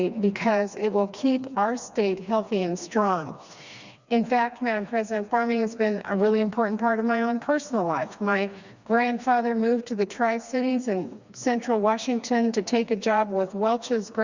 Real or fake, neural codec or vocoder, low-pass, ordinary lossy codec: fake; codec, 16 kHz, 2 kbps, FreqCodec, smaller model; 7.2 kHz; Opus, 64 kbps